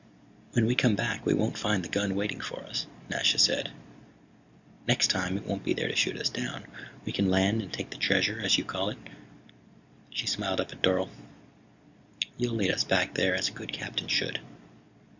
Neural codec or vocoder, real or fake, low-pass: none; real; 7.2 kHz